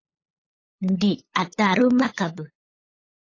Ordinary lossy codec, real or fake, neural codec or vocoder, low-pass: AAC, 32 kbps; fake; codec, 16 kHz, 8 kbps, FunCodec, trained on LibriTTS, 25 frames a second; 7.2 kHz